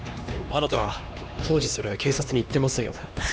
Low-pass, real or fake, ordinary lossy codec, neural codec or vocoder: none; fake; none; codec, 16 kHz, 2 kbps, X-Codec, HuBERT features, trained on LibriSpeech